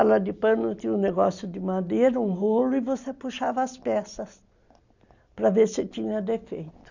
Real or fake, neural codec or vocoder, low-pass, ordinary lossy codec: real; none; 7.2 kHz; none